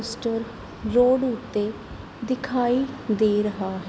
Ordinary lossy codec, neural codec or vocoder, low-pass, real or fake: none; none; none; real